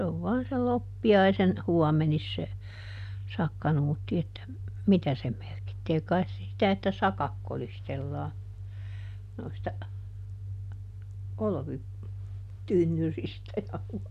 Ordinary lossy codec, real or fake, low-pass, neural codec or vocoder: none; real; 14.4 kHz; none